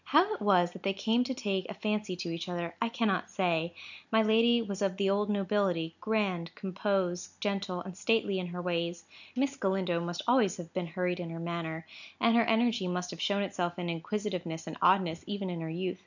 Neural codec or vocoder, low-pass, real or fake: none; 7.2 kHz; real